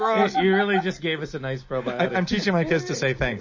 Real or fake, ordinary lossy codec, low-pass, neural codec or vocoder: real; MP3, 32 kbps; 7.2 kHz; none